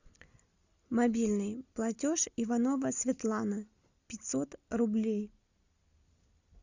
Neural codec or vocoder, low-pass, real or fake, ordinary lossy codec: none; 7.2 kHz; real; Opus, 64 kbps